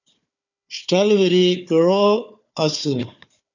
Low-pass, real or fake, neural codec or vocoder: 7.2 kHz; fake; codec, 16 kHz, 4 kbps, FunCodec, trained on Chinese and English, 50 frames a second